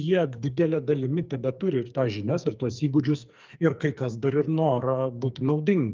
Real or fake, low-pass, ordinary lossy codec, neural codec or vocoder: fake; 7.2 kHz; Opus, 32 kbps; codec, 44.1 kHz, 2.6 kbps, SNAC